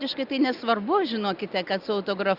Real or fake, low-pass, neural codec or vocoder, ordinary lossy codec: real; 5.4 kHz; none; Opus, 64 kbps